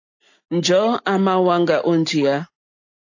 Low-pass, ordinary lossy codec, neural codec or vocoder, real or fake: 7.2 kHz; AAC, 48 kbps; none; real